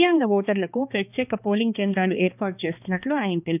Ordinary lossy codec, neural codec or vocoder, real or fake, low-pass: none; codec, 16 kHz, 2 kbps, X-Codec, HuBERT features, trained on balanced general audio; fake; 3.6 kHz